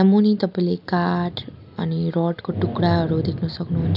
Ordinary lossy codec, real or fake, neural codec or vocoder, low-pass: none; real; none; 5.4 kHz